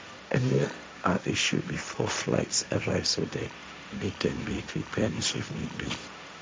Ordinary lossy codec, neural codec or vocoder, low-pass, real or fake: none; codec, 16 kHz, 1.1 kbps, Voila-Tokenizer; none; fake